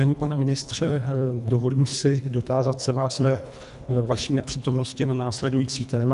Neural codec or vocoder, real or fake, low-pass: codec, 24 kHz, 1.5 kbps, HILCodec; fake; 10.8 kHz